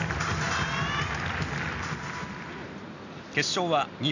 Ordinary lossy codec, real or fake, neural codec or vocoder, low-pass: none; real; none; 7.2 kHz